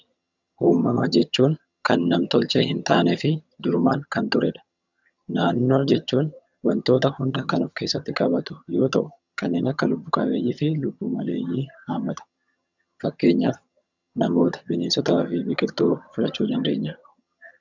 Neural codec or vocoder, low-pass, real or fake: vocoder, 22.05 kHz, 80 mel bands, HiFi-GAN; 7.2 kHz; fake